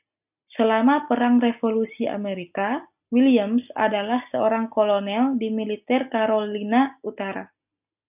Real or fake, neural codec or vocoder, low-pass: real; none; 3.6 kHz